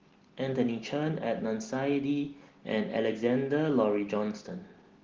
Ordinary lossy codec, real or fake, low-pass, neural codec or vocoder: Opus, 16 kbps; real; 7.2 kHz; none